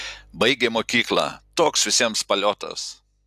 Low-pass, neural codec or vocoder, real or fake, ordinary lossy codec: 14.4 kHz; none; real; AAC, 96 kbps